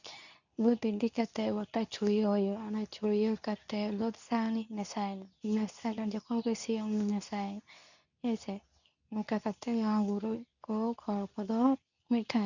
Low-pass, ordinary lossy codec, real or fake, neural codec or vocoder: 7.2 kHz; none; fake; codec, 24 kHz, 0.9 kbps, WavTokenizer, medium speech release version 2